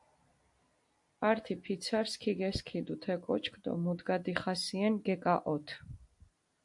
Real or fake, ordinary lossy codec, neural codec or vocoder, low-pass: real; AAC, 64 kbps; none; 10.8 kHz